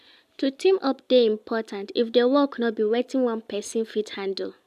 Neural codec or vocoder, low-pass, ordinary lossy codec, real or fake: none; 14.4 kHz; AAC, 96 kbps; real